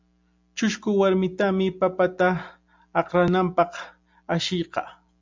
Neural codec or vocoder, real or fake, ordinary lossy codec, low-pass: none; real; MP3, 64 kbps; 7.2 kHz